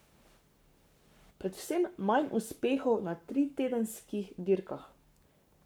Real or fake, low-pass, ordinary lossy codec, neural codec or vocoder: fake; none; none; codec, 44.1 kHz, 7.8 kbps, Pupu-Codec